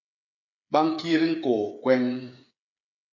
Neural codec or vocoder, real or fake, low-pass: codec, 16 kHz, 8 kbps, FreqCodec, smaller model; fake; 7.2 kHz